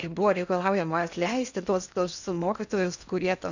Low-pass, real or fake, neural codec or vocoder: 7.2 kHz; fake; codec, 16 kHz in and 24 kHz out, 0.8 kbps, FocalCodec, streaming, 65536 codes